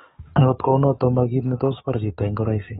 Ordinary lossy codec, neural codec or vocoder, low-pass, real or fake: AAC, 16 kbps; codec, 44.1 kHz, 7.8 kbps, DAC; 19.8 kHz; fake